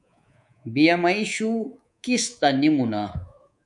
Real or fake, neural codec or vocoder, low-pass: fake; codec, 24 kHz, 3.1 kbps, DualCodec; 10.8 kHz